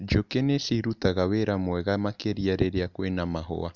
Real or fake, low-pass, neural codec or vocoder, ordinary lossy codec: real; 7.2 kHz; none; none